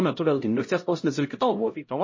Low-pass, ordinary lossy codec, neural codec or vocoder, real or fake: 7.2 kHz; MP3, 32 kbps; codec, 16 kHz, 0.5 kbps, X-Codec, HuBERT features, trained on LibriSpeech; fake